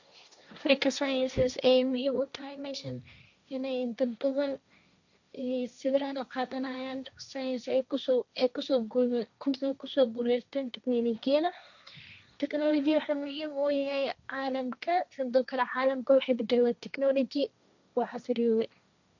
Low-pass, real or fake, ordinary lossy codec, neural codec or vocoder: 7.2 kHz; fake; none; codec, 16 kHz, 1.1 kbps, Voila-Tokenizer